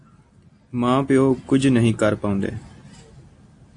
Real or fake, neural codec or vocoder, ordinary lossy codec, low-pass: real; none; AAC, 48 kbps; 9.9 kHz